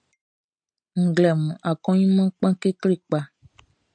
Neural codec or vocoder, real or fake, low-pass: none; real; 9.9 kHz